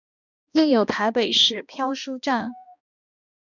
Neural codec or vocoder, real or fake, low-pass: codec, 16 kHz, 1 kbps, X-Codec, HuBERT features, trained on balanced general audio; fake; 7.2 kHz